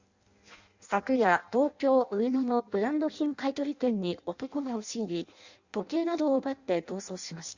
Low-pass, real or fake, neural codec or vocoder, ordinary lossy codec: 7.2 kHz; fake; codec, 16 kHz in and 24 kHz out, 0.6 kbps, FireRedTTS-2 codec; Opus, 64 kbps